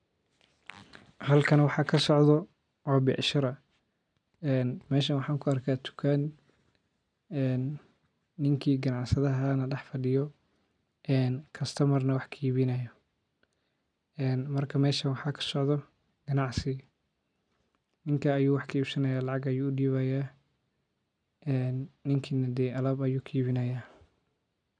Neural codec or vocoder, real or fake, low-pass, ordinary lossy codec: none; real; 9.9 kHz; none